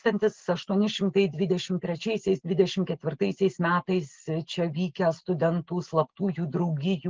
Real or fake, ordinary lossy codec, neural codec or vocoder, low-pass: real; Opus, 16 kbps; none; 7.2 kHz